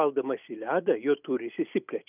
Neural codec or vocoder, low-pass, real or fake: none; 3.6 kHz; real